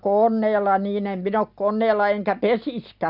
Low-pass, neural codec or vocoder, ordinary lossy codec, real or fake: 5.4 kHz; none; none; real